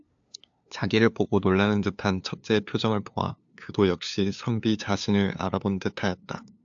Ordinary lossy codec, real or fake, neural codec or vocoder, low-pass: AAC, 64 kbps; fake; codec, 16 kHz, 2 kbps, FunCodec, trained on LibriTTS, 25 frames a second; 7.2 kHz